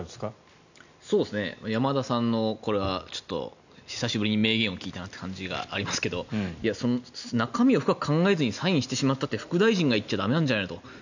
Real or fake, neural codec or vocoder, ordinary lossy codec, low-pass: real; none; none; 7.2 kHz